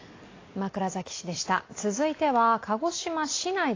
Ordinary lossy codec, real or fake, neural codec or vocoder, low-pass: AAC, 32 kbps; real; none; 7.2 kHz